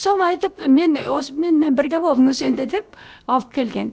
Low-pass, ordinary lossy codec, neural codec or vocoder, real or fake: none; none; codec, 16 kHz, about 1 kbps, DyCAST, with the encoder's durations; fake